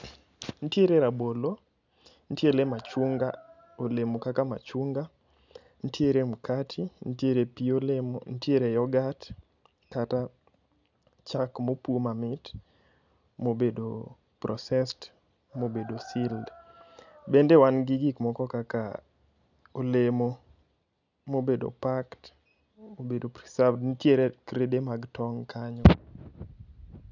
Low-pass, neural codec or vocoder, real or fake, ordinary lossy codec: 7.2 kHz; none; real; none